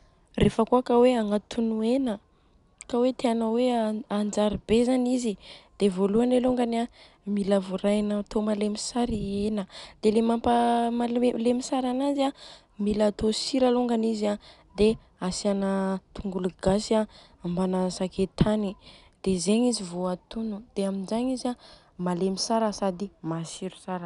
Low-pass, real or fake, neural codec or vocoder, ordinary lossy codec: 10.8 kHz; real; none; none